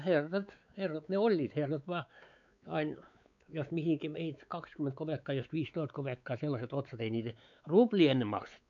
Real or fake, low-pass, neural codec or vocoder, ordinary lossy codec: fake; 7.2 kHz; codec, 16 kHz, 4 kbps, X-Codec, WavLM features, trained on Multilingual LibriSpeech; none